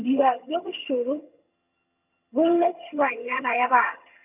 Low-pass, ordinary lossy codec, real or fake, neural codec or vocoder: 3.6 kHz; none; fake; vocoder, 22.05 kHz, 80 mel bands, HiFi-GAN